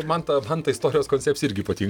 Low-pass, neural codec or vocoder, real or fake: 19.8 kHz; none; real